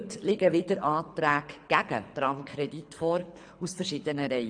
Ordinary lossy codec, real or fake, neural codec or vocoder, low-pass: AAC, 64 kbps; fake; codec, 24 kHz, 6 kbps, HILCodec; 9.9 kHz